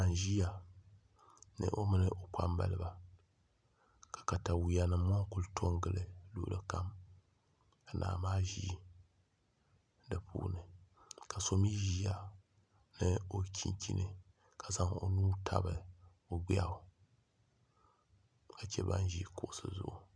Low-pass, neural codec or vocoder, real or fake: 9.9 kHz; none; real